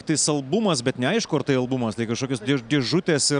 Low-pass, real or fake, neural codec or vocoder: 9.9 kHz; real; none